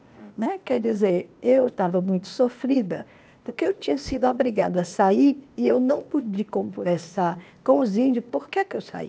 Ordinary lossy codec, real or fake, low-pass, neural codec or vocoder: none; fake; none; codec, 16 kHz, 0.8 kbps, ZipCodec